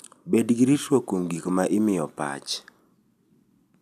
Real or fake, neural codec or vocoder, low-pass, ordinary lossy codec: real; none; 14.4 kHz; none